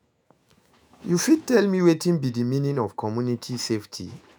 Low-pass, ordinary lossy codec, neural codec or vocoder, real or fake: none; none; autoencoder, 48 kHz, 128 numbers a frame, DAC-VAE, trained on Japanese speech; fake